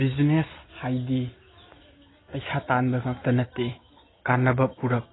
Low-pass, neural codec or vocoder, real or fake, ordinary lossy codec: 7.2 kHz; none; real; AAC, 16 kbps